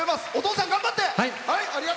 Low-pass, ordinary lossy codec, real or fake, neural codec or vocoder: none; none; real; none